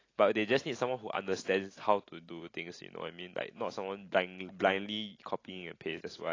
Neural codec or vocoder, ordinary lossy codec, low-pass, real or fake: none; AAC, 32 kbps; 7.2 kHz; real